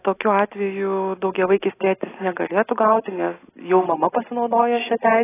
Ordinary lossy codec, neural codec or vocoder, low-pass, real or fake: AAC, 16 kbps; none; 3.6 kHz; real